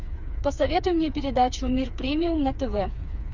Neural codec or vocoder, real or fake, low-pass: codec, 16 kHz, 4 kbps, FreqCodec, smaller model; fake; 7.2 kHz